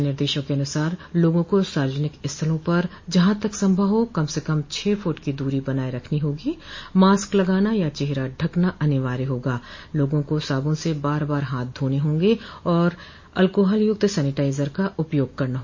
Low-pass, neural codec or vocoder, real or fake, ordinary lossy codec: 7.2 kHz; none; real; MP3, 32 kbps